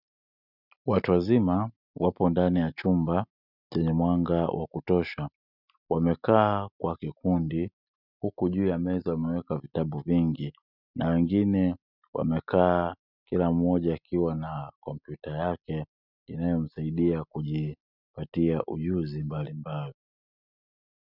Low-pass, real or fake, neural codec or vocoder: 5.4 kHz; real; none